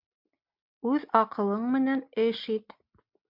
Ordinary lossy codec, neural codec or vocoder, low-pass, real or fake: MP3, 48 kbps; none; 5.4 kHz; real